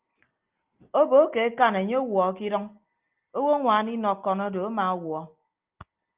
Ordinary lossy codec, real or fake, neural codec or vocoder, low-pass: Opus, 24 kbps; real; none; 3.6 kHz